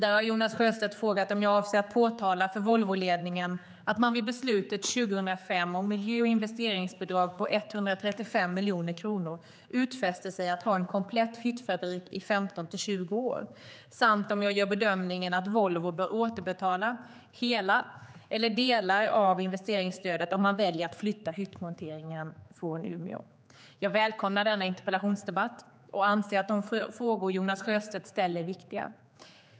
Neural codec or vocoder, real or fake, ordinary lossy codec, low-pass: codec, 16 kHz, 4 kbps, X-Codec, HuBERT features, trained on general audio; fake; none; none